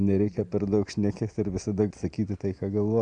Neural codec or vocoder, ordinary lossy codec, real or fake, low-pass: none; MP3, 96 kbps; real; 9.9 kHz